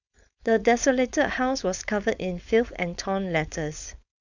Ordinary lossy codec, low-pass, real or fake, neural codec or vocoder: none; 7.2 kHz; fake; codec, 16 kHz, 4.8 kbps, FACodec